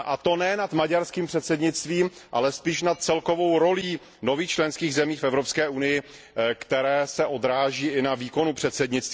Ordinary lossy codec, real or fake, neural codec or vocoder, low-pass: none; real; none; none